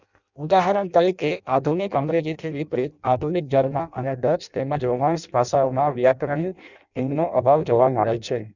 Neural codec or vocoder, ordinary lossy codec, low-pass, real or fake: codec, 16 kHz in and 24 kHz out, 0.6 kbps, FireRedTTS-2 codec; none; 7.2 kHz; fake